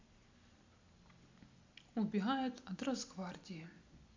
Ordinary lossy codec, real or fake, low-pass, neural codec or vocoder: MP3, 64 kbps; real; 7.2 kHz; none